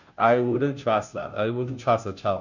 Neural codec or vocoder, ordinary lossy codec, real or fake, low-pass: codec, 16 kHz, 0.5 kbps, FunCodec, trained on Chinese and English, 25 frames a second; none; fake; 7.2 kHz